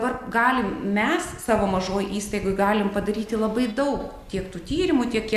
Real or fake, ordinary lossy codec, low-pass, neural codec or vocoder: real; Opus, 64 kbps; 14.4 kHz; none